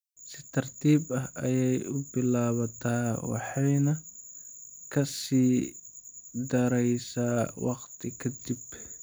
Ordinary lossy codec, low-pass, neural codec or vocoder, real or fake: none; none; none; real